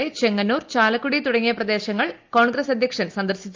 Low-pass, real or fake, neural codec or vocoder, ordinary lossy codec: 7.2 kHz; real; none; Opus, 32 kbps